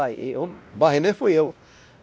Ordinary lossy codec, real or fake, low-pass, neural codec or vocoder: none; fake; none; codec, 16 kHz, 1 kbps, X-Codec, WavLM features, trained on Multilingual LibriSpeech